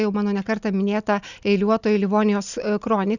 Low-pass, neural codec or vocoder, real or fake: 7.2 kHz; none; real